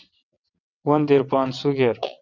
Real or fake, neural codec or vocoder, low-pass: fake; vocoder, 22.05 kHz, 80 mel bands, WaveNeXt; 7.2 kHz